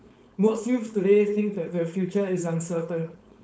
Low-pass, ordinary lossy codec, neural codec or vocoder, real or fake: none; none; codec, 16 kHz, 4.8 kbps, FACodec; fake